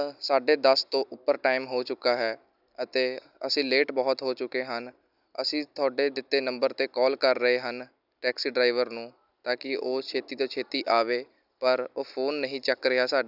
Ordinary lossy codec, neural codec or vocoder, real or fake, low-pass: none; none; real; 5.4 kHz